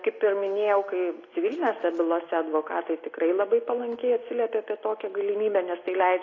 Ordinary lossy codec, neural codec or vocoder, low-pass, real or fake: AAC, 32 kbps; none; 7.2 kHz; real